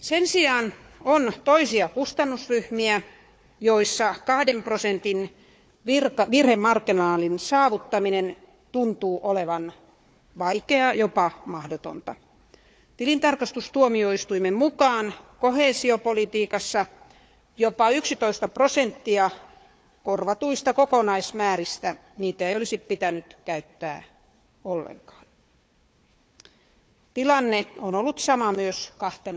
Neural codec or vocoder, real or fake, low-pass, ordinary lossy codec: codec, 16 kHz, 4 kbps, FunCodec, trained on Chinese and English, 50 frames a second; fake; none; none